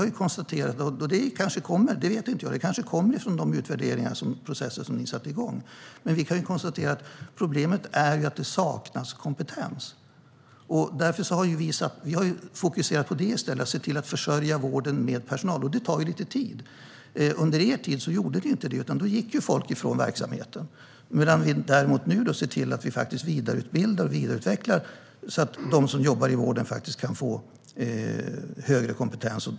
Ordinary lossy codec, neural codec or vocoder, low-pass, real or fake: none; none; none; real